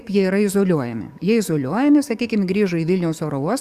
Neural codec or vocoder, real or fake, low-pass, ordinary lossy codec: codec, 44.1 kHz, 7.8 kbps, DAC; fake; 14.4 kHz; Opus, 64 kbps